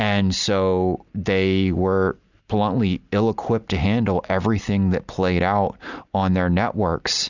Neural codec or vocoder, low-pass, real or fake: none; 7.2 kHz; real